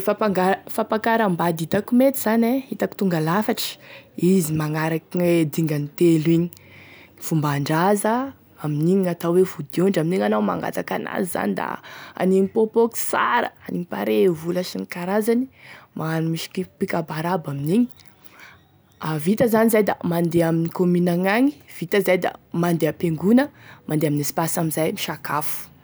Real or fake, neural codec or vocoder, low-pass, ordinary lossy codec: real; none; none; none